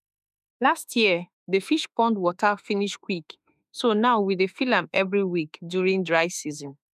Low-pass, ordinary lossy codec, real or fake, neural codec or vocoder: 14.4 kHz; none; fake; autoencoder, 48 kHz, 32 numbers a frame, DAC-VAE, trained on Japanese speech